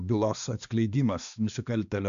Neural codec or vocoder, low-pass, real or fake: codec, 16 kHz, 2 kbps, X-Codec, HuBERT features, trained on balanced general audio; 7.2 kHz; fake